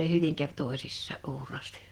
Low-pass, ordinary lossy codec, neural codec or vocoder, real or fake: 19.8 kHz; Opus, 16 kbps; vocoder, 48 kHz, 128 mel bands, Vocos; fake